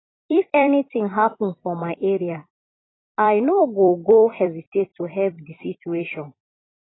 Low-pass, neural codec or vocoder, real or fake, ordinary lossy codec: 7.2 kHz; vocoder, 44.1 kHz, 128 mel bands every 256 samples, BigVGAN v2; fake; AAC, 16 kbps